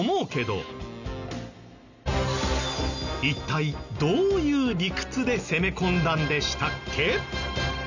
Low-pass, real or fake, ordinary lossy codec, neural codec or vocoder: 7.2 kHz; real; none; none